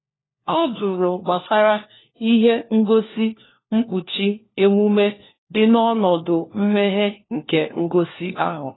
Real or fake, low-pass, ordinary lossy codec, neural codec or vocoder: fake; 7.2 kHz; AAC, 16 kbps; codec, 16 kHz, 1 kbps, FunCodec, trained on LibriTTS, 50 frames a second